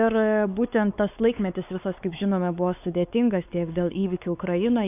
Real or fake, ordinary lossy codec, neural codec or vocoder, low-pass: fake; AAC, 32 kbps; codec, 16 kHz, 4 kbps, X-Codec, WavLM features, trained on Multilingual LibriSpeech; 3.6 kHz